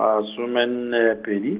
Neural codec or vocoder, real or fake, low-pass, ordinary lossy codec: none; real; 3.6 kHz; Opus, 16 kbps